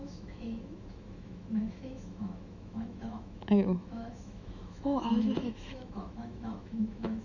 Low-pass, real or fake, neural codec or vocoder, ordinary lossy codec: 7.2 kHz; fake; autoencoder, 48 kHz, 128 numbers a frame, DAC-VAE, trained on Japanese speech; none